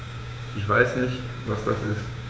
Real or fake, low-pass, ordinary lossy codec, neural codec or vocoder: fake; none; none; codec, 16 kHz, 6 kbps, DAC